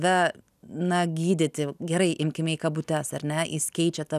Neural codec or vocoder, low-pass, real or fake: none; 14.4 kHz; real